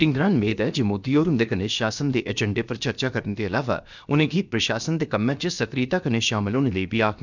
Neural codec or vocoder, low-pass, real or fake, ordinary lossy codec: codec, 16 kHz, about 1 kbps, DyCAST, with the encoder's durations; 7.2 kHz; fake; none